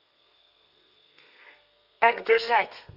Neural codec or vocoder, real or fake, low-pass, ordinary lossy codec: codec, 32 kHz, 1.9 kbps, SNAC; fake; 5.4 kHz; none